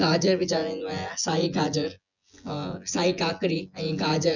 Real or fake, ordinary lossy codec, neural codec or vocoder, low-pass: fake; none; vocoder, 24 kHz, 100 mel bands, Vocos; 7.2 kHz